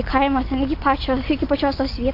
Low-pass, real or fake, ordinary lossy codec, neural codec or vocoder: 5.4 kHz; real; AAC, 32 kbps; none